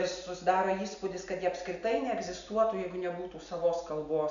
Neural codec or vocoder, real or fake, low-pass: none; real; 7.2 kHz